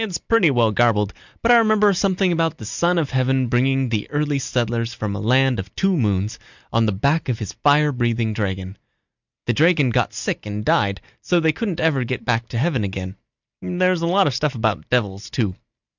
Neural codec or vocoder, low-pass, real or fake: none; 7.2 kHz; real